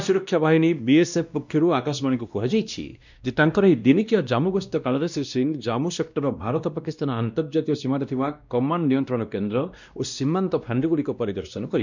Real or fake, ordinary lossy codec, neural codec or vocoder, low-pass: fake; none; codec, 16 kHz, 1 kbps, X-Codec, WavLM features, trained on Multilingual LibriSpeech; 7.2 kHz